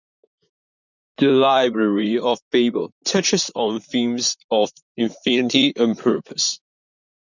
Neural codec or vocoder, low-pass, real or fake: vocoder, 44.1 kHz, 128 mel bands, Pupu-Vocoder; 7.2 kHz; fake